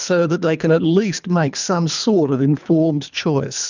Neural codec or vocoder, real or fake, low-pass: codec, 24 kHz, 3 kbps, HILCodec; fake; 7.2 kHz